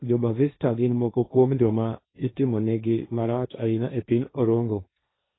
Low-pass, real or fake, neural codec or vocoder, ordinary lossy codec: 7.2 kHz; fake; codec, 16 kHz, 1.1 kbps, Voila-Tokenizer; AAC, 16 kbps